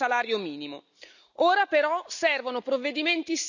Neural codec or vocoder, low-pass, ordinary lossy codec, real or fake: none; 7.2 kHz; none; real